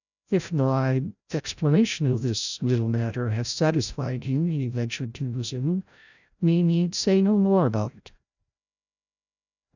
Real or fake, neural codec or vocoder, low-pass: fake; codec, 16 kHz, 0.5 kbps, FreqCodec, larger model; 7.2 kHz